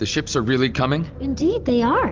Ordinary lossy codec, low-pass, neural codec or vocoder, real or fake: Opus, 32 kbps; 7.2 kHz; none; real